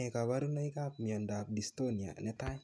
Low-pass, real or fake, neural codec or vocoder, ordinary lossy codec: none; real; none; none